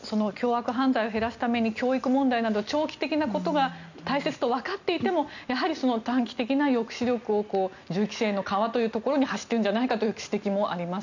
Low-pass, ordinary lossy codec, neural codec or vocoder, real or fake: 7.2 kHz; none; none; real